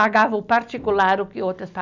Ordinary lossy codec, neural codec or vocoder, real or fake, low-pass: none; none; real; 7.2 kHz